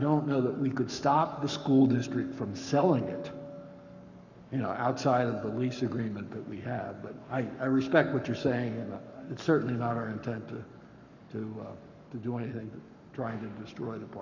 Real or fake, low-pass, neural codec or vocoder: fake; 7.2 kHz; codec, 44.1 kHz, 7.8 kbps, Pupu-Codec